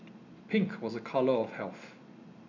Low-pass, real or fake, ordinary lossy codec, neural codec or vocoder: 7.2 kHz; real; none; none